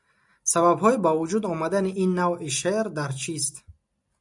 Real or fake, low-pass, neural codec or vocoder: real; 10.8 kHz; none